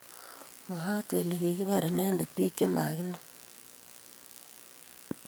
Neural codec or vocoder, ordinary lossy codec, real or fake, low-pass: codec, 44.1 kHz, 2.6 kbps, SNAC; none; fake; none